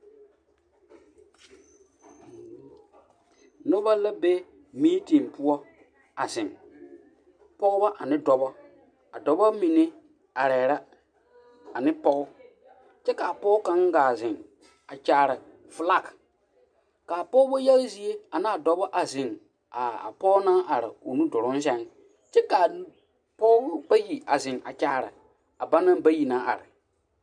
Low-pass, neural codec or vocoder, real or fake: 9.9 kHz; none; real